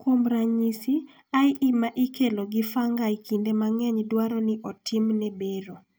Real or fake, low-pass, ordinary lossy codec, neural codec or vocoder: real; none; none; none